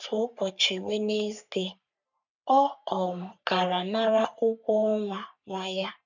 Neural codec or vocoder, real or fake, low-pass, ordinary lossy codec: codec, 44.1 kHz, 3.4 kbps, Pupu-Codec; fake; 7.2 kHz; none